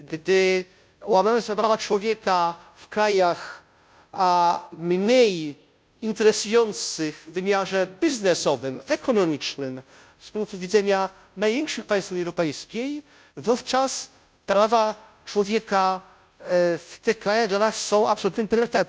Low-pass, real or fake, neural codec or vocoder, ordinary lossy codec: none; fake; codec, 16 kHz, 0.5 kbps, FunCodec, trained on Chinese and English, 25 frames a second; none